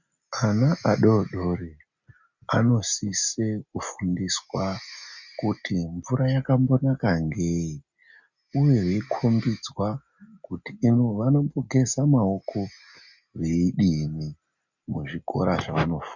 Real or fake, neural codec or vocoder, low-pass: real; none; 7.2 kHz